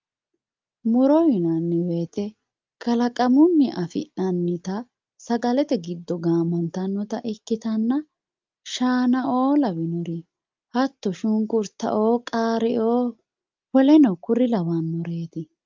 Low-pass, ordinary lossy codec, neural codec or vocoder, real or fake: 7.2 kHz; Opus, 24 kbps; none; real